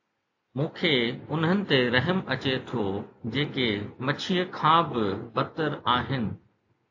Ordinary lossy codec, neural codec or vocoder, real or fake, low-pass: AAC, 48 kbps; none; real; 7.2 kHz